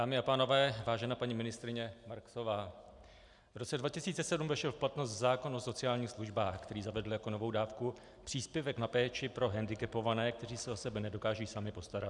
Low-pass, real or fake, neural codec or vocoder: 10.8 kHz; real; none